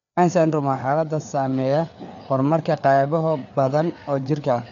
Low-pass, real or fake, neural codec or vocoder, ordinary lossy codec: 7.2 kHz; fake; codec, 16 kHz, 4 kbps, FreqCodec, larger model; none